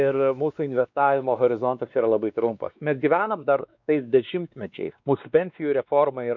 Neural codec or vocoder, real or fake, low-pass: codec, 16 kHz, 2 kbps, X-Codec, WavLM features, trained on Multilingual LibriSpeech; fake; 7.2 kHz